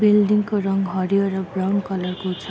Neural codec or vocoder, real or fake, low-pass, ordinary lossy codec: none; real; none; none